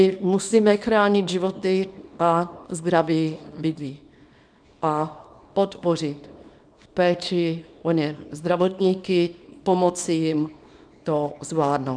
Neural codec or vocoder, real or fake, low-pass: codec, 24 kHz, 0.9 kbps, WavTokenizer, small release; fake; 9.9 kHz